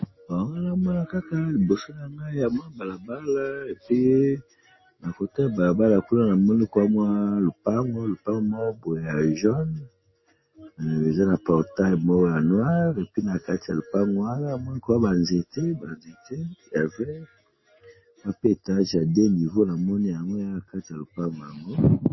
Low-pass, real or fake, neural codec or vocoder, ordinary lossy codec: 7.2 kHz; real; none; MP3, 24 kbps